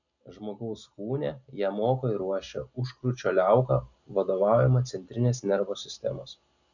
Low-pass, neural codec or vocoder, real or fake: 7.2 kHz; none; real